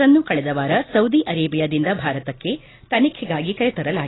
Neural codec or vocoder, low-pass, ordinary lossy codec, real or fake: none; 7.2 kHz; AAC, 16 kbps; real